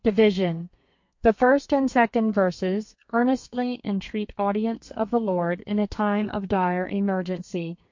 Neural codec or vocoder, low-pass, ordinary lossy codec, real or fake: codec, 32 kHz, 1.9 kbps, SNAC; 7.2 kHz; MP3, 48 kbps; fake